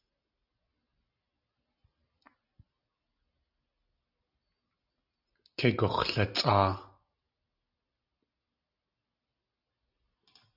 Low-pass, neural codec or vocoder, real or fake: 5.4 kHz; none; real